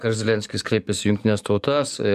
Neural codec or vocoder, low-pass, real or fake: codec, 44.1 kHz, 7.8 kbps, DAC; 14.4 kHz; fake